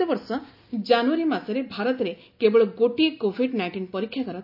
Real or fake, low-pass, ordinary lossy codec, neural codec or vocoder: real; 5.4 kHz; none; none